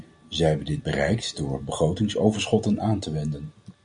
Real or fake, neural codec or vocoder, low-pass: real; none; 9.9 kHz